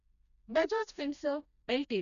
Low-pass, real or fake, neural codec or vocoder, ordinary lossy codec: 7.2 kHz; fake; codec, 16 kHz, 1 kbps, FreqCodec, smaller model; none